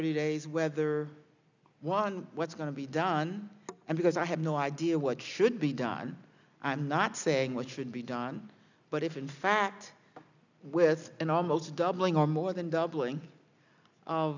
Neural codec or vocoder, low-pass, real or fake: none; 7.2 kHz; real